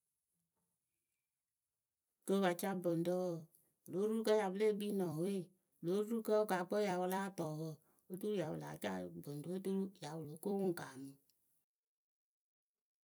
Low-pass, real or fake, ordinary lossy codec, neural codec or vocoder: none; fake; none; vocoder, 44.1 kHz, 128 mel bands every 256 samples, BigVGAN v2